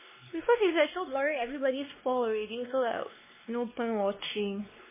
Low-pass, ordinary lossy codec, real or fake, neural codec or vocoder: 3.6 kHz; MP3, 16 kbps; fake; codec, 16 kHz, 2 kbps, X-Codec, WavLM features, trained on Multilingual LibriSpeech